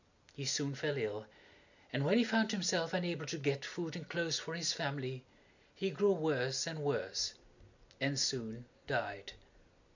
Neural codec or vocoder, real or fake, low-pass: none; real; 7.2 kHz